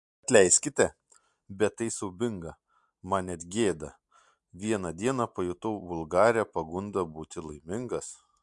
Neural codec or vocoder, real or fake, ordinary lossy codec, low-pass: none; real; MP3, 64 kbps; 10.8 kHz